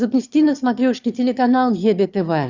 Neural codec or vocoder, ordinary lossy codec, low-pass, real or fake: autoencoder, 22.05 kHz, a latent of 192 numbers a frame, VITS, trained on one speaker; Opus, 64 kbps; 7.2 kHz; fake